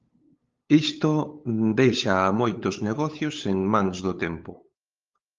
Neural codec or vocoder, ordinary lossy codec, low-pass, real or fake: codec, 16 kHz, 8 kbps, FunCodec, trained on LibriTTS, 25 frames a second; Opus, 32 kbps; 7.2 kHz; fake